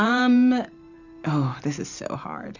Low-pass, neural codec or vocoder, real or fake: 7.2 kHz; vocoder, 44.1 kHz, 128 mel bands every 512 samples, BigVGAN v2; fake